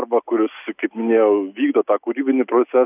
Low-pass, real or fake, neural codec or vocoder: 3.6 kHz; real; none